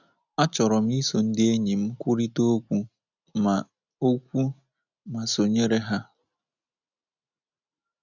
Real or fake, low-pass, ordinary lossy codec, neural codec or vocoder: real; 7.2 kHz; none; none